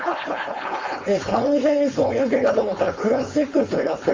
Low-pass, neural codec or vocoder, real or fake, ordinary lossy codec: 7.2 kHz; codec, 16 kHz, 4.8 kbps, FACodec; fake; Opus, 32 kbps